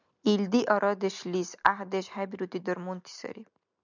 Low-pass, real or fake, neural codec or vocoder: 7.2 kHz; real; none